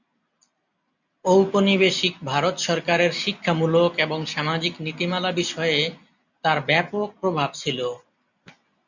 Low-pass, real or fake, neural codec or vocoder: 7.2 kHz; real; none